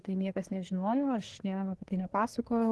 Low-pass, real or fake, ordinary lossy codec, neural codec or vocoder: 10.8 kHz; fake; Opus, 16 kbps; codec, 24 kHz, 1 kbps, SNAC